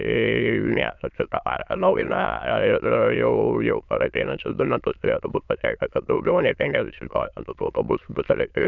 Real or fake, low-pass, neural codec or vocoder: fake; 7.2 kHz; autoencoder, 22.05 kHz, a latent of 192 numbers a frame, VITS, trained on many speakers